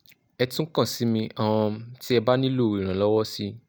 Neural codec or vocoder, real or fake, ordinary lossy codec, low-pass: none; real; none; none